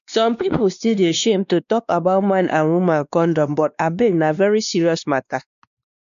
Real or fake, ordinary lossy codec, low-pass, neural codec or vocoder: fake; none; 7.2 kHz; codec, 16 kHz, 2 kbps, X-Codec, WavLM features, trained on Multilingual LibriSpeech